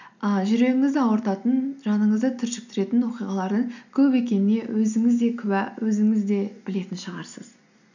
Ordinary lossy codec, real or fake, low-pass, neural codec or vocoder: none; real; 7.2 kHz; none